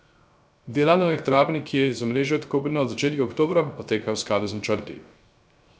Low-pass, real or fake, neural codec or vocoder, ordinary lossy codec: none; fake; codec, 16 kHz, 0.3 kbps, FocalCodec; none